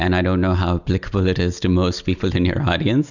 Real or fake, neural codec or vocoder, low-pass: real; none; 7.2 kHz